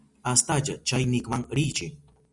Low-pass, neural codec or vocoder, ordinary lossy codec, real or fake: 10.8 kHz; none; Opus, 64 kbps; real